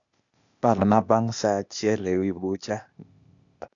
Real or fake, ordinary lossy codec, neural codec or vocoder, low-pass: fake; none; codec, 16 kHz, 0.8 kbps, ZipCodec; 7.2 kHz